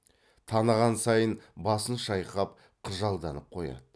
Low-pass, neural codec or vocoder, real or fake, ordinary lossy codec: none; none; real; none